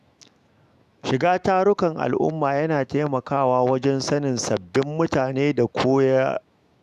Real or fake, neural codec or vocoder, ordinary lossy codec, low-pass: fake; autoencoder, 48 kHz, 128 numbers a frame, DAC-VAE, trained on Japanese speech; Opus, 64 kbps; 14.4 kHz